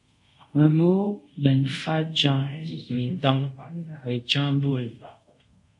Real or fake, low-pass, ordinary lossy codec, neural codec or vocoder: fake; 10.8 kHz; MP3, 48 kbps; codec, 24 kHz, 0.5 kbps, DualCodec